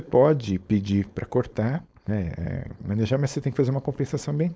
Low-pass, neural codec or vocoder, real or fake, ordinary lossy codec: none; codec, 16 kHz, 4.8 kbps, FACodec; fake; none